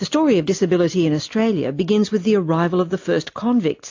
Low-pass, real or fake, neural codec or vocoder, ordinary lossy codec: 7.2 kHz; real; none; AAC, 48 kbps